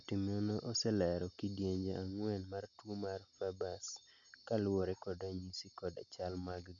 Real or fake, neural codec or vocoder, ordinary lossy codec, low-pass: real; none; none; 7.2 kHz